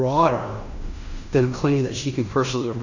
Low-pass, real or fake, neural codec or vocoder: 7.2 kHz; fake; codec, 16 kHz in and 24 kHz out, 0.9 kbps, LongCat-Audio-Codec, fine tuned four codebook decoder